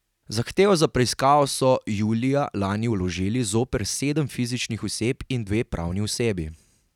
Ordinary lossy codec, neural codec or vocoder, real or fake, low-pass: none; none; real; 19.8 kHz